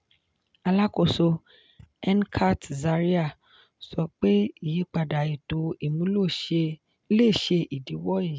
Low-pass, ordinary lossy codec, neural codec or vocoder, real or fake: none; none; none; real